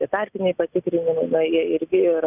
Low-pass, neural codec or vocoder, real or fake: 3.6 kHz; none; real